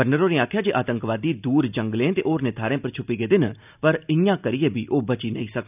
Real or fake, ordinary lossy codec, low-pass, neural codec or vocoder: real; none; 3.6 kHz; none